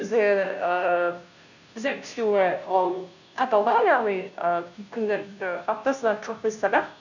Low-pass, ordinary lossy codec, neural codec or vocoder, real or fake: 7.2 kHz; none; codec, 16 kHz, 0.5 kbps, FunCodec, trained on Chinese and English, 25 frames a second; fake